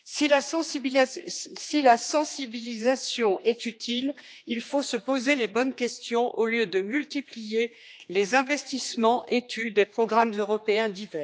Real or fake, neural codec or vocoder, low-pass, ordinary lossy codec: fake; codec, 16 kHz, 2 kbps, X-Codec, HuBERT features, trained on general audio; none; none